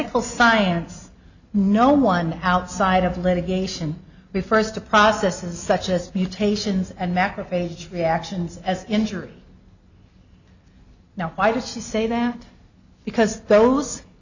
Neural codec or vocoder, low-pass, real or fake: none; 7.2 kHz; real